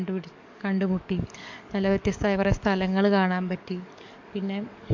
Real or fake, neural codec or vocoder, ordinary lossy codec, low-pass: fake; codec, 44.1 kHz, 7.8 kbps, DAC; MP3, 48 kbps; 7.2 kHz